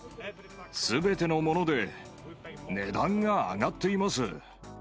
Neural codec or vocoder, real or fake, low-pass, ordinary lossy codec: none; real; none; none